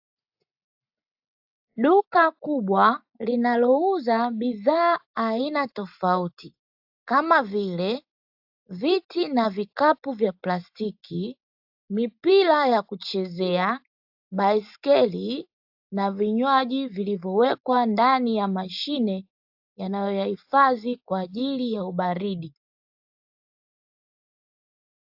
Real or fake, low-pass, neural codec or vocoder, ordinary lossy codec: real; 5.4 kHz; none; AAC, 48 kbps